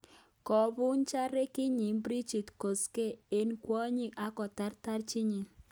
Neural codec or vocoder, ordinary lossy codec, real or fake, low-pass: none; none; real; none